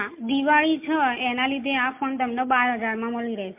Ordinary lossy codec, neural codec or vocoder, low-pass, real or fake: MP3, 32 kbps; none; 3.6 kHz; real